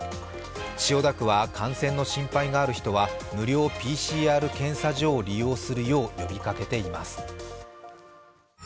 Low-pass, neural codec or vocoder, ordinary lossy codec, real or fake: none; none; none; real